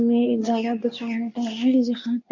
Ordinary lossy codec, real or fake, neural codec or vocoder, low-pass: none; fake; codec, 24 kHz, 0.9 kbps, WavTokenizer, medium speech release version 2; 7.2 kHz